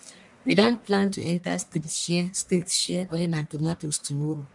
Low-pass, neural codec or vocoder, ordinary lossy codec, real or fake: 10.8 kHz; codec, 44.1 kHz, 1.7 kbps, Pupu-Codec; none; fake